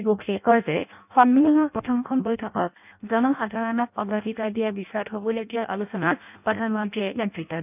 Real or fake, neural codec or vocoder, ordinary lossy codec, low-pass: fake; codec, 16 kHz in and 24 kHz out, 0.6 kbps, FireRedTTS-2 codec; none; 3.6 kHz